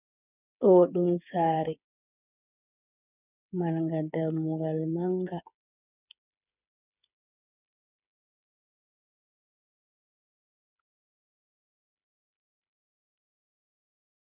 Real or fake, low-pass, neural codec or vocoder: fake; 3.6 kHz; codec, 16 kHz, 8 kbps, FreqCodec, smaller model